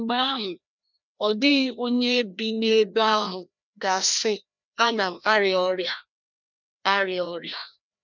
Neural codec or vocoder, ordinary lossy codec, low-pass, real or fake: codec, 16 kHz, 1 kbps, FreqCodec, larger model; none; 7.2 kHz; fake